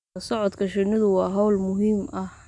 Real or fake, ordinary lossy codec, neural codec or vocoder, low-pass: real; none; none; 10.8 kHz